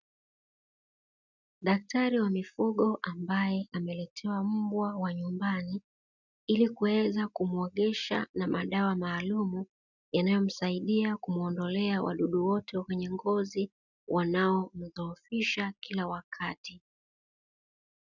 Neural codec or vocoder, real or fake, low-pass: none; real; 7.2 kHz